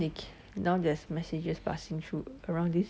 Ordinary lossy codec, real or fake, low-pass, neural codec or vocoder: none; real; none; none